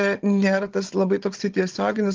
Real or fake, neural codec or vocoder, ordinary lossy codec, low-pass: fake; codec, 16 kHz, 16 kbps, FunCodec, trained on Chinese and English, 50 frames a second; Opus, 16 kbps; 7.2 kHz